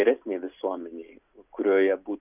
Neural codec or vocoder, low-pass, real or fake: none; 3.6 kHz; real